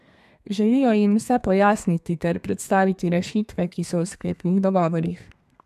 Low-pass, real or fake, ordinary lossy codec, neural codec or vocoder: 14.4 kHz; fake; MP3, 96 kbps; codec, 32 kHz, 1.9 kbps, SNAC